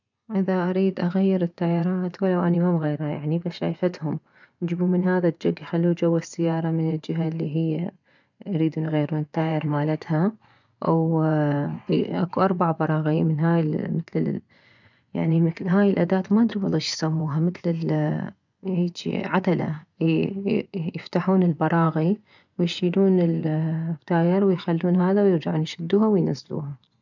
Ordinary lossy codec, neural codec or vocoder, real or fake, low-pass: none; vocoder, 24 kHz, 100 mel bands, Vocos; fake; 7.2 kHz